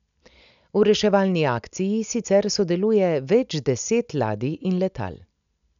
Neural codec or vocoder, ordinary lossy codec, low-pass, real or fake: none; none; 7.2 kHz; real